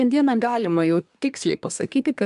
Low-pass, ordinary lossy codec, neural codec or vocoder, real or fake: 10.8 kHz; AAC, 96 kbps; codec, 24 kHz, 1 kbps, SNAC; fake